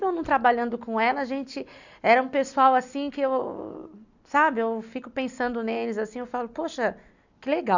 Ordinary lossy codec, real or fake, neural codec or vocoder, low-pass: none; real; none; 7.2 kHz